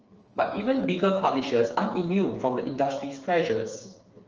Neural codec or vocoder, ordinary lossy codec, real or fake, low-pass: codec, 16 kHz, 4 kbps, FreqCodec, smaller model; Opus, 24 kbps; fake; 7.2 kHz